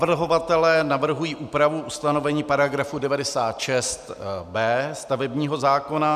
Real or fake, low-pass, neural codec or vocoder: real; 14.4 kHz; none